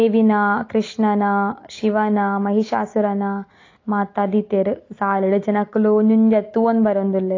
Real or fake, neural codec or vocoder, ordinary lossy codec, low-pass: real; none; AAC, 32 kbps; 7.2 kHz